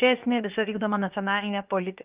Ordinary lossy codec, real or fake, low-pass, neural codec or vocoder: Opus, 24 kbps; fake; 3.6 kHz; codec, 16 kHz, about 1 kbps, DyCAST, with the encoder's durations